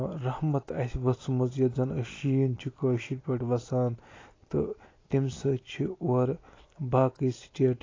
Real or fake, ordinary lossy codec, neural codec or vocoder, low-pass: real; AAC, 32 kbps; none; 7.2 kHz